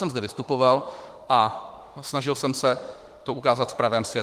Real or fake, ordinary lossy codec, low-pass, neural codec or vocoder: fake; Opus, 24 kbps; 14.4 kHz; autoencoder, 48 kHz, 32 numbers a frame, DAC-VAE, trained on Japanese speech